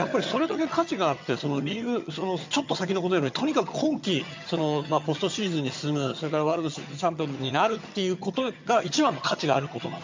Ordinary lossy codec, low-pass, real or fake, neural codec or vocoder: MP3, 64 kbps; 7.2 kHz; fake; vocoder, 22.05 kHz, 80 mel bands, HiFi-GAN